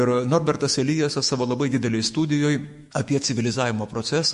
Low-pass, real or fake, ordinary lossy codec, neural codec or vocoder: 14.4 kHz; fake; MP3, 48 kbps; autoencoder, 48 kHz, 128 numbers a frame, DAC-VAE, trained on Japanese speech